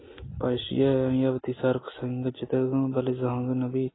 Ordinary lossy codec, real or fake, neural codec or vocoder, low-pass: AAC, 16 kbps; real; none; 7.2 kHz